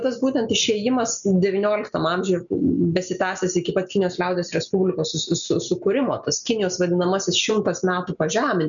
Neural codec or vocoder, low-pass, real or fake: none; 7.2 kHz; real